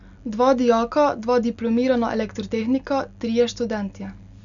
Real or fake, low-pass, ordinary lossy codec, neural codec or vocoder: real; 7.2 kHz; none; none